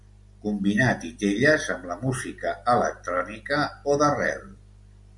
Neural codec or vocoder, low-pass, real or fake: none; 10.8 kHz; real